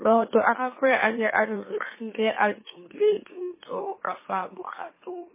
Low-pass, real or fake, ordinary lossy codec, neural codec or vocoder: 3.6 kHz; fake; MP3, 16 kbps; autoencoder, 44.1 kHz, a latent of 192 numbers a frame, MeloTTS